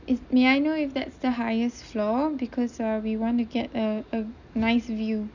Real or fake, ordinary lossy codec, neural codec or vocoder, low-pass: real; none; none; 7.2 kHz